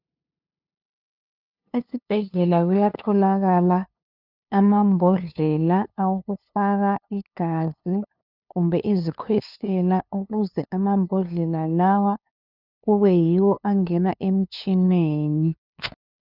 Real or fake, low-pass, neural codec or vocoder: fake; 5.4 kHz; codec, 16 kHz, 2 kbps, FunCodec, trained on LibriTTS, 25 frames a second